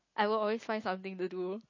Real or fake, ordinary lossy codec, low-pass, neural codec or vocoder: real; MP3, 32 kbps; 7.2 kHz; none